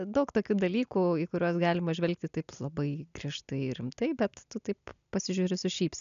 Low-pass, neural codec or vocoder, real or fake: 7.2 kHz; none; real